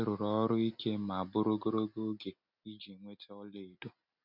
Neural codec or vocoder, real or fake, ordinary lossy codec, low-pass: none; real; none; 5.4 kHz